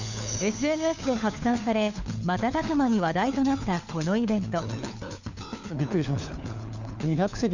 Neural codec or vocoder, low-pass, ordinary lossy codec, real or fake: codec, 16 kHz, 4 kbps, FunCodec, trained on LibriTTS, 50 frames a second; 7.2 kHz; none; fake